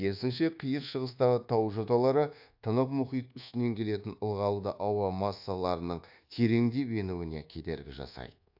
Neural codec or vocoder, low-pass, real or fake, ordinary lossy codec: codec, 24 kHz, 1.2 kbps, DualCodec; 5.4 kHz; fake; AAC, 48 kbps